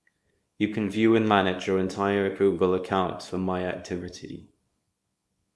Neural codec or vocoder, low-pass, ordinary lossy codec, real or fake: codec, 24 kHz, 0.9 kbps, WavTokenizer, small release; none; none; fake